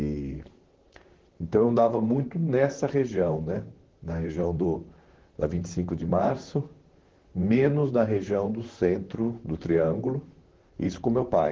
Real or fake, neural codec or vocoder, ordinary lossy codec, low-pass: fake; vocoder, 44.1 kHz, 128 mel bands, Pupu-Vocoder; Opus, 16 kbps; 7.2 kHz